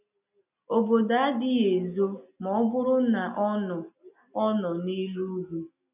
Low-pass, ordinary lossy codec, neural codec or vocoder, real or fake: 3.6 kHz; none; none; real